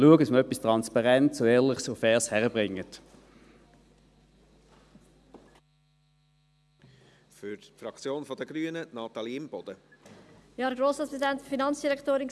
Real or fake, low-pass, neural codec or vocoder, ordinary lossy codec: real; none; none; none